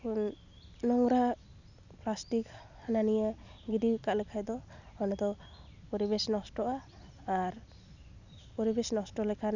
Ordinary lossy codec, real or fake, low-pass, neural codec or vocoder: none; real; 7.2 kHz; none